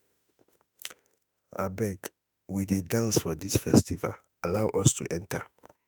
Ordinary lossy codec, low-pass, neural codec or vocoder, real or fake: none; none; autoencoder, 48 kHz, 32 numbers a frame, DAC-VAE, trained on Japanese speech; fake